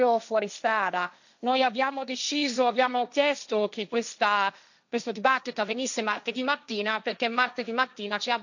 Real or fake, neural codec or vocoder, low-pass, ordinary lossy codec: fake; codec, 16 kHz, 1.1 kbps, Voila-Tokenizer; 7.2 kHz; none